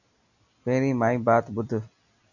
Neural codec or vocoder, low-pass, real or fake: none; 7.2 kHz; real